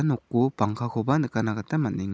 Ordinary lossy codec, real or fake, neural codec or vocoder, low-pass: none; real; none; none